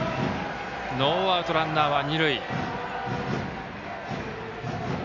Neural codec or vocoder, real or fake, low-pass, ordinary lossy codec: none; real; 7.2 kHz; none